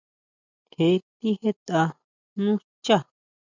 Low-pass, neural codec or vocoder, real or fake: 7.2 kHz; none; real